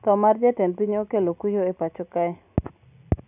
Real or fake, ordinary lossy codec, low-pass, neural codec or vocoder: real; none; 3.6 kHz; none